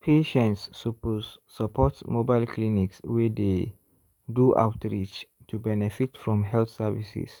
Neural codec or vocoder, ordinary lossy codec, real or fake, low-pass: codec, 44.1 kHz, 7.8 kbps, DAC; none; fake; 19.8 kHz